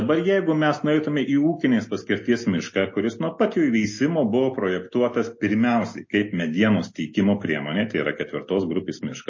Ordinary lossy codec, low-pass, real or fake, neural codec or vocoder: MP3, 32 kbps; 7.2 kHz; real; none